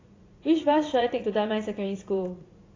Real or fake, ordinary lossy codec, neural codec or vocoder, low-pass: fake; AAC, 32 kbps; vocoder, 44.1 kHz, 80 mel bands, Vocos; 7.2 kHz